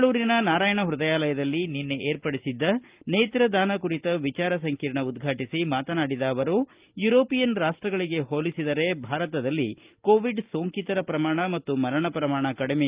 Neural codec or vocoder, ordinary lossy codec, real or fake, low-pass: none; Opus, 24 kbps; real; 3.6 kHz